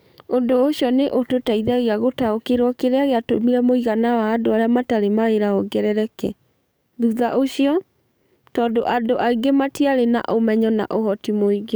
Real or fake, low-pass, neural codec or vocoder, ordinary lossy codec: fake; none; codec, 44.1 kHz, 7.8 kbps, DAC; none